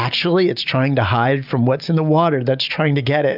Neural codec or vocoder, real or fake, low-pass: none; real; 5.4 kHz